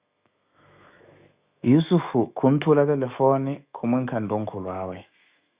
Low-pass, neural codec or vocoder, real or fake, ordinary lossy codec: 3.6 kHz; autoencoder, 48 kHz, 128 numbers a frame, DAC-VAE, trained on Japanese speech; fake; Opus, 64 kbps